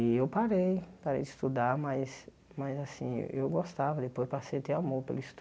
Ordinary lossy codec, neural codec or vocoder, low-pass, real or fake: none; none; none; real